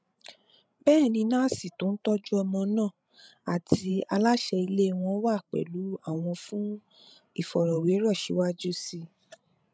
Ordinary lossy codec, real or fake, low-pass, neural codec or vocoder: none; fake; none; codec, 16 kHz, 16 kbps, FreqCodec, larger model